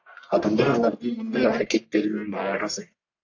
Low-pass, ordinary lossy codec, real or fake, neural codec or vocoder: 7.2 kHz; AAC, 48 kbps; fake; codec, 44.1 kHz, 1.7 kbps, Pupu-Codec